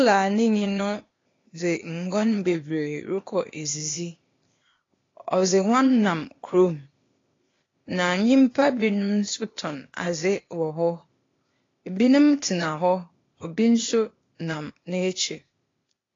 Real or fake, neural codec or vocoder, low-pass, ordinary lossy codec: fake; codec, 16 kHz, 0.8 kbps, ZipCodec; 7.2 kHz; AAC, 32 kbps